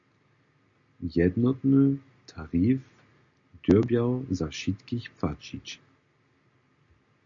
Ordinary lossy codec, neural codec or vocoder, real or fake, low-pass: MP3, 64 kbps; none; real; 7.2 kHz